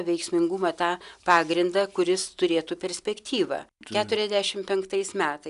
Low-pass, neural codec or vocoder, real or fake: 10.8 kHz; none; real